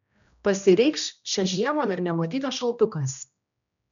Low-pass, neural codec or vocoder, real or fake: 7.2 kHz; codec, 16 kHz, 1 kbps, X-Codec, HuBERT features, trained on general audio; fake